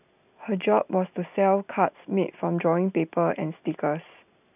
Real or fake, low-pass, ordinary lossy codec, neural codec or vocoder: real; 3.6 kHz; none; none